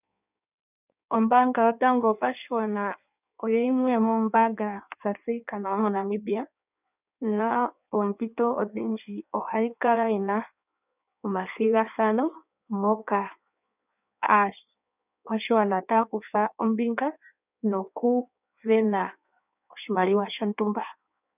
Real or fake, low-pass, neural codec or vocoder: fake; 3.6 kHz; codec, 16 kHz in and 24 kHz out, 1.1 kbps, FireRedTTS-2 codec